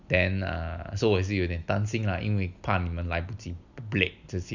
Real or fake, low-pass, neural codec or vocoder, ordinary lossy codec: real; 7.2 kHz; none; none